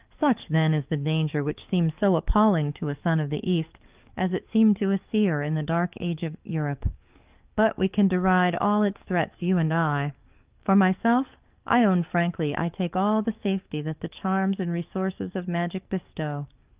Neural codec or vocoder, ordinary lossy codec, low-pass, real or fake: codec, 44.1 kHz, 7.8 kbps, DAC; Opus, 24 kbps; 3.6 kHz; fake